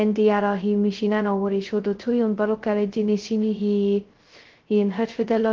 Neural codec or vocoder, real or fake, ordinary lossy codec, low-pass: codec, 16 kHz, 0.2 kbps, FocalCodec; fake; Opus, 16 kbps; 7.2 kHz